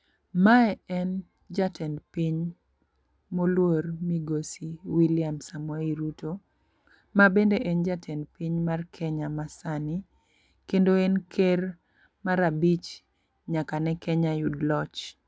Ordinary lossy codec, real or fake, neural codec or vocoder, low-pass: none; real; none; none